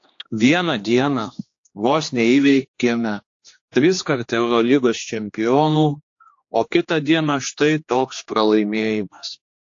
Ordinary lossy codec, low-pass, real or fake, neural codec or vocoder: AAC, 48 kbps; 7.2 kHz; fake; codec, 16 kHz, 2 kbps, X-Codec, HuBERT features, trained on general audio